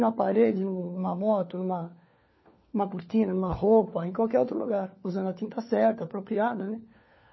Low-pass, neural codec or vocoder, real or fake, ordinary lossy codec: 7.2 kHz; codec, 16 kHz, 4 kbps, FunCodec, trained on LibriTTS, 50 frames a second; fake; MP3, 24 kbps